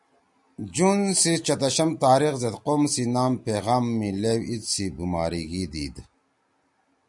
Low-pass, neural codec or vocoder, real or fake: 10.8 kHz; none; real